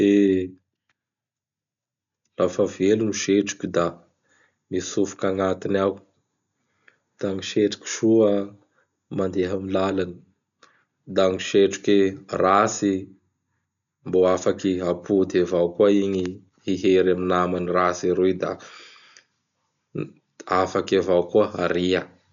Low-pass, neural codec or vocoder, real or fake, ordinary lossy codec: 7.2 kHz; none; real; none